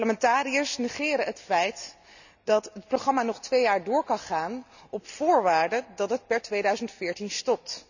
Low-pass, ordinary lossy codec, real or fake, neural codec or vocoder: 7.2 kHz; none; real; none